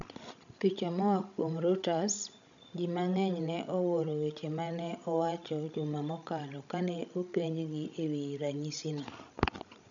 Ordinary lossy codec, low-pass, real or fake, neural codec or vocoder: none; 7.2 kHz; fake; codec, 16 kHz, 16 kbps, FreqCodec, larger model